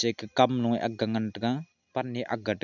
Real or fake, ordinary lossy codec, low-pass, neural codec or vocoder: real; none; 7.2 kHz; none